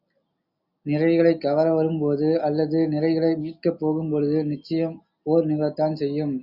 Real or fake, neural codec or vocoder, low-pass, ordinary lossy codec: real; none; 5.4 kHz; Opus, 64 kbps